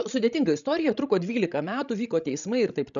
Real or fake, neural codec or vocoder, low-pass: fake; codec, 16 kHz, 16 kbps, FreqCodec, larger model; 7.2 kHz